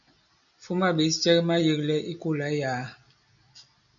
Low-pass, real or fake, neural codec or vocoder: 7.2 kHz; real; none